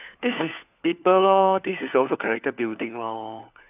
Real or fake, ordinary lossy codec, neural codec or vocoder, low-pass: fake; none; codec, 16 kHz, 4 kbps, FunCodec, trained on LibriTTS, 50 frames a second; 3.6 kHz